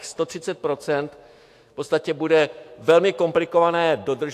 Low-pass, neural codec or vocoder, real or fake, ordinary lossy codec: 14.4 kHz; autoencoder, 48 kHz, 128 numbers a frame, DAC-VAE, trained on Japanese speech; fake; AAC, 64 kbps